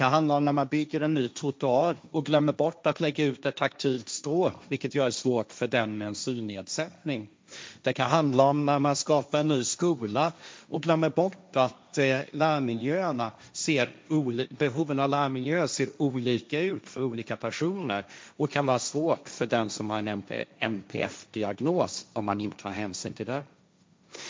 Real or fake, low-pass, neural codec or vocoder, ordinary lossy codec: fake; none; codec, 16 kHz, 1.1 kbps, Voila-Tokenizer; none